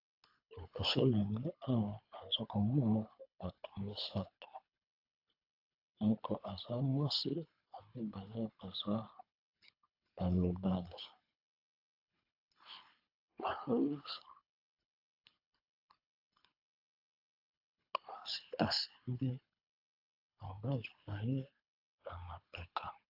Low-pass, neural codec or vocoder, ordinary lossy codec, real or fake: 5.4 kHz; codec, 24 kHz, 3 kbps, HILCodec; AAC, 48 kbps; fake